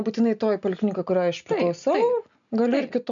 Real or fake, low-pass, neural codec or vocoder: real; 7.2 kHz; none